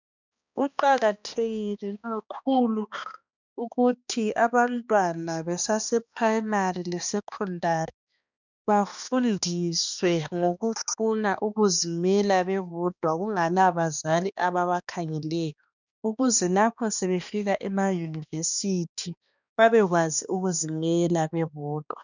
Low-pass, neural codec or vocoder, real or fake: 7.2 kHz; codec, 16 kHz, 2 kbps, X-Codec, HuBERT features, trained on balanced general audio; fake